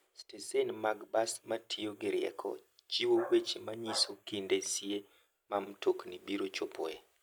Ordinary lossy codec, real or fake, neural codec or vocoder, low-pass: none; real; none; none